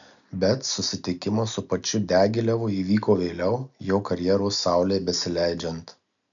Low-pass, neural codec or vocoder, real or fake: 7.2 kHz; none; real